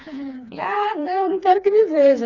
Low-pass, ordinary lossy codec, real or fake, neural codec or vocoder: 7.2 kHz; none; fake; codec, 16 kHz, 2 kbps, FreqCodec, smaller model